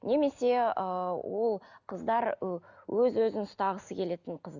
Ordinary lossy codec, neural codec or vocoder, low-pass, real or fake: none; none; 7.2 kHz; real